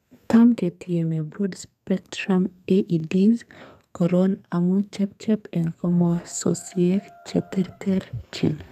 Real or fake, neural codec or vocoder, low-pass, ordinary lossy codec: fake; codec, 32 kHz, 1.9 kbps, SNAC; 14.4 kHz; none